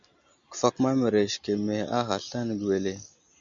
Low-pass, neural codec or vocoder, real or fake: 7.2 kHz; none; real